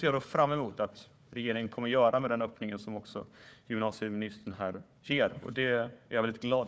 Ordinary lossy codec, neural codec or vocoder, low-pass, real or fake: none; codec, 16 kHz, 4 kbps, FunCodec, trained on Chinese and English, 50 frames a second; none; fake